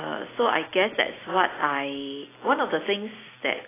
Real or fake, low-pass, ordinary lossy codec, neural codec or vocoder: real; 3.6 kHz; AAC, 16 kbps; none